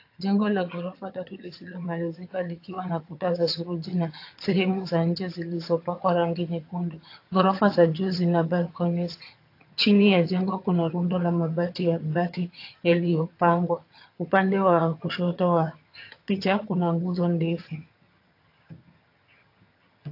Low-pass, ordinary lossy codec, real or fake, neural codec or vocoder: 5.4 kHz; AAC, 32 kbps; fake; vocoder, 22.05 kHz, 80 mel bands, HiFi-GAN